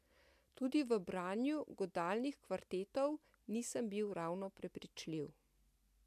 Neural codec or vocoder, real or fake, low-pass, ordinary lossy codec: none; real; 14.4 kHz; AAC, 96 kbps